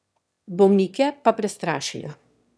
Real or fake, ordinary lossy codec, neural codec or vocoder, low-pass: fake; none; autoencoder, 22.05 kHz, a latent of 192 numbers a frame, VITS, trained on one speaker; none